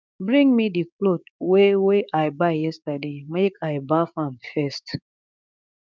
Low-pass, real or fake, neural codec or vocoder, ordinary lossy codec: none; fake; codec, 16 kHz, 6 kbps, DAC; none